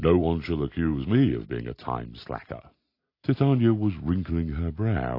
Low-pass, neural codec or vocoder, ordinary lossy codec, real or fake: 5.4 kHz; none; AAC, 32 kbps; real